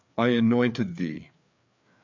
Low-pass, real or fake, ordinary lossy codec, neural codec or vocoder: 7.2 kHz; fake; MP3, 64 kbps; codec, 16 kHz, 4 kbps, FreqCodec, larger model